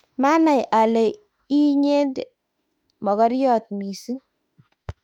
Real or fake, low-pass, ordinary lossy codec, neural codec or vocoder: fake; 19.8 kHz; none; autoencoder, 48 kHz, 32 numbers a frame, DAC-VAE, trained on Japanese speech